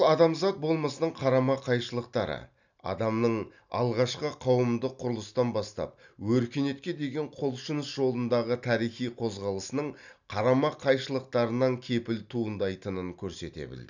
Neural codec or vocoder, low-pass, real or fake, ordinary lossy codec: none; 7.2 kHz; real; none